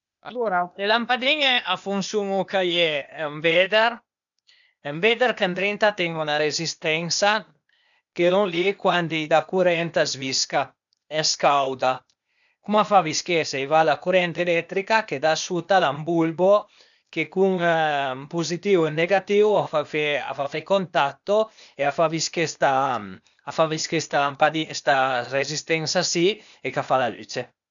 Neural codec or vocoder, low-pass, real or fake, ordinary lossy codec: codec, 16 kHz, 0.8 kbps, ZipCodec; 7.2 kHz; fake; none